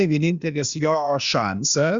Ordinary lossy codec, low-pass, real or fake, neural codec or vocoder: Opus, 64 kbps; 7.2 kHz; fake; codec, 16 kHz, 0.8 kbps, ZipCodec